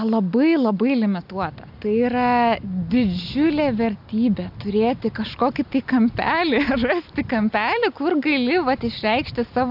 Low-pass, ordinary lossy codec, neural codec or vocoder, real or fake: 5.4 kHz; AAC, 48 kbps; none; real